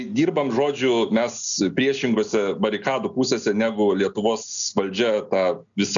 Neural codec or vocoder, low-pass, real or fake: none; 7.2 kHz; real